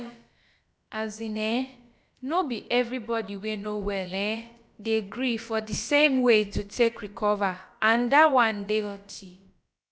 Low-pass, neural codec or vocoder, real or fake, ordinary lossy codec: none; codec, 16 kHz, about 1 kbps, DyCAST, with the encoder's durations; fake; none